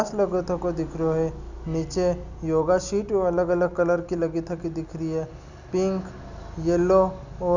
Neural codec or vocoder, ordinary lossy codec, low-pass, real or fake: none; none; 7.2 kHz; real